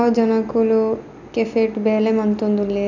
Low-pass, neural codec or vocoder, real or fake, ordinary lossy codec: 7.2 kHz; none; real; none